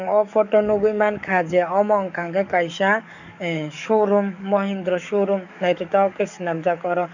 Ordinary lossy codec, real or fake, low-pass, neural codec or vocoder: none; fake; 7.2 kHz; codec, 44.1 kHz, 7.8 kbps, DAC